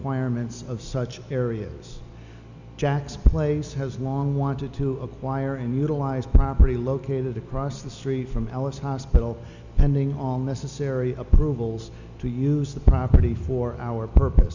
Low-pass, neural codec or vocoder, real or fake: 7.2 kHz; none; real